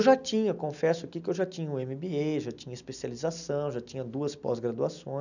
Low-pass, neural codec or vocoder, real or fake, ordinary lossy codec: 7.2 kHz; none; real; none